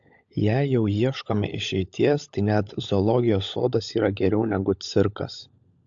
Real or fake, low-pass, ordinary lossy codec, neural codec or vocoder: fake; 7.2 kHz; AAC, 64 kbps; codec, 16 kHz, 16 kbps, FunCodec, trained on LibriTTS, 50 frames a second